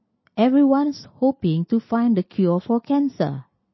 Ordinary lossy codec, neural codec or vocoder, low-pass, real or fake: MP3, 24 kbps; none; 7.2 kHz; real